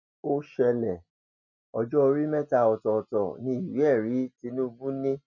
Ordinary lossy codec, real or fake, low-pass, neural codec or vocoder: none; real; 7.2 kHz; none